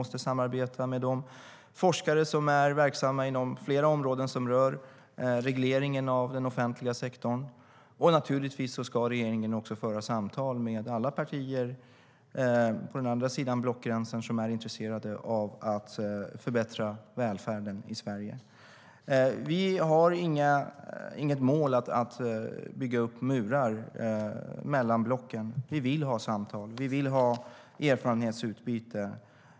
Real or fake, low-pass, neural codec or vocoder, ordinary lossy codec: real; none; none; none